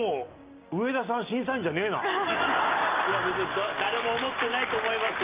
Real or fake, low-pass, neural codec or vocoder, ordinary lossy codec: real; 3.6 kHz; none; Opus, 32 kbps